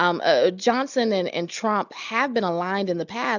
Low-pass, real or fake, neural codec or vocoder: 7.2 kHz; real; none